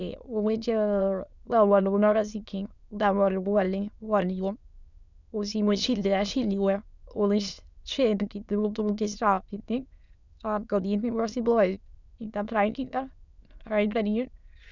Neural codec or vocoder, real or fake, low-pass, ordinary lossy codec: autoencoder, 22.05 kHz, a latent of 192 numbers a frame, VITS, trained on many speakers; fake; 7.2 kHz; none